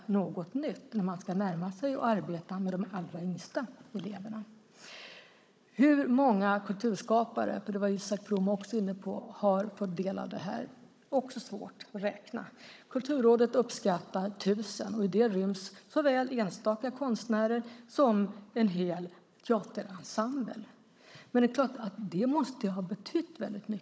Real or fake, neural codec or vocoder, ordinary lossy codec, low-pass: fake; codec, 16 kHz, 16 kbps, FunCodec, trained on Chinese and English, 50 frames a second; none; none